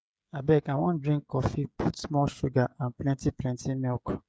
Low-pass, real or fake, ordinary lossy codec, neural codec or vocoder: none; fake; none; codec, 16 kHz, 16 kbps, FreqCodec, smaller model